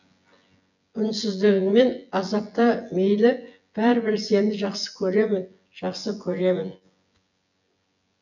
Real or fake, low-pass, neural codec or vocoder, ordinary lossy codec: fake; 7.2 kHz; vocoder, 24 kHz, 100 mel bands, Vocos; none